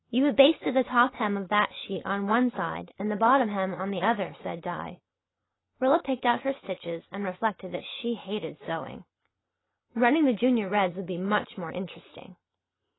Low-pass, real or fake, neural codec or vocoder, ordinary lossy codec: 7.2 kHz; real; none; AAC, 16 kbps